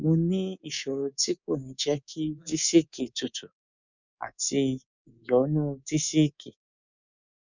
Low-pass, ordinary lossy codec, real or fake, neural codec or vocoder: 7.2 kHz; none; fake; codec, 24 kHz, 3.1 kbps, DualCodec